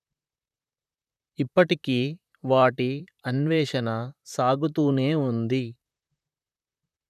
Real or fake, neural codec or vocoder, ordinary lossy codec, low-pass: fake; vocoder, 44.1 kHz, 128 mel bands, Pupu-Vocoder; none; 14.4 kHz